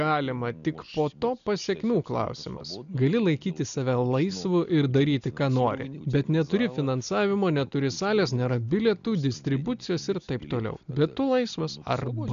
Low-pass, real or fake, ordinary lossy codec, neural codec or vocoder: 7.2 kHz; real; MP3, 96 kbps; none